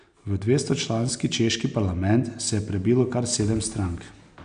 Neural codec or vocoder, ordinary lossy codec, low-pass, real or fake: none; none; 9.9 kHz; real